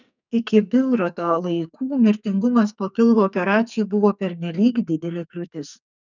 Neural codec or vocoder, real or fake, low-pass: codec, 44.1 kHz, 2.6 kbps, SNAC; fake; 7.2 kHz